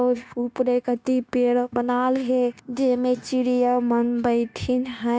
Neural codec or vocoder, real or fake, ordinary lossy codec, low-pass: codec, 16 kHz, 0.9 kbps, LongCat-Audio-Codec; fake; none; none